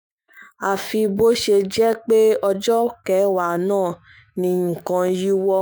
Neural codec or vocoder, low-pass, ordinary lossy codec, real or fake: autoencoder, 48 kHz, 128 numbers a frame, DAC-VAE, trained on Japanese speech; none; none; fake